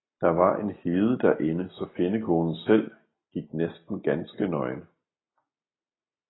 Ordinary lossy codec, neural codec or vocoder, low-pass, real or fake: AAC, 16 kbps; none; 7.2 kHz; real